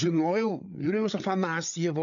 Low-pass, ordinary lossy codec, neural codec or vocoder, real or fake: 7.2 kHz; AAC, 64 kbps; codec, 16 kHz, 8 kbps, FreqCodec, larger model; fake